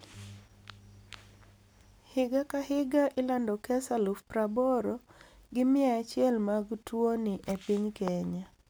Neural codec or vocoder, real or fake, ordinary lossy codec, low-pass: none; real; none; none